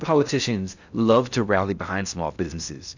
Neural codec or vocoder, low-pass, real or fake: codec, 16 kHz in and 24 kHz out, 0.6 kbps, FocalCodec, streaming, 4096 codes; 7.2 kHz; fake